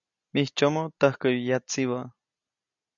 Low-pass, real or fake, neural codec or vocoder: 7.2 kHz; real; none